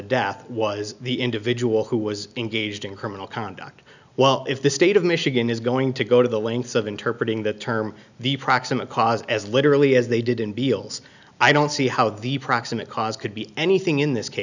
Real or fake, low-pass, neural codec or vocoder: real; 7.2 kHz; none